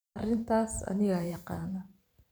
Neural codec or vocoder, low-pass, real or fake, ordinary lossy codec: vocoder, 44.1 kHz, 128 mel bands every 512 samples, BigVGAN v2; none; fake; none